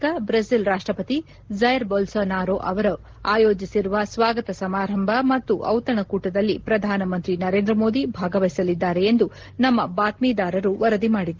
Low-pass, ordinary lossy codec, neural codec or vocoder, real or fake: 7.2 kHz; Opus, 16 kbps; none; real